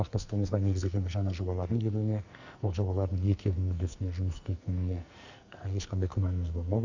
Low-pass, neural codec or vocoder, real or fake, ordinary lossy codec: 7.2 kHz; codec, 44.1 kHz, 2.6 kbps, SNAC; fake; Opus, 64 kbps